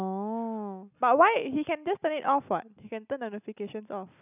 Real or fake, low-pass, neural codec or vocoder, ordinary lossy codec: real; 3.6 kHz; none; none